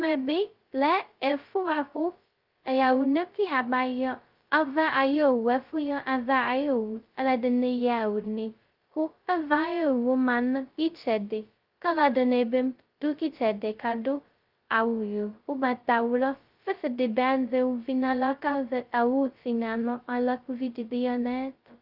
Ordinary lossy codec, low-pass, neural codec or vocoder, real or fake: Opus, 32 kbps; 5.4 kHz; codec, 16 kHz, 0.2 kbps, FocalCodec; fake